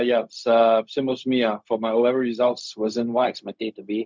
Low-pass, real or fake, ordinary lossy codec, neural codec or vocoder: none; fake; none; codec, 16 kHz, 0.4 kbps, LongCat-Audio-Codec